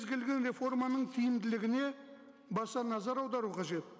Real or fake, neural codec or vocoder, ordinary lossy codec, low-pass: real; none; none; none